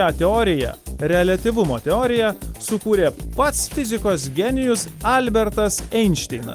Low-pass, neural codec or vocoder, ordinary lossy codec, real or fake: 14.4 kHz; none; Opus, 32 kbps; real